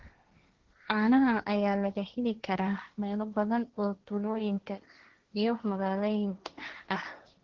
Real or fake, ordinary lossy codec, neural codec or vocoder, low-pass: fake; Opus, 16 kbps; codec, 16 kHz, 1.1 kbps, Voila-Tokenizer; 7.2 kHz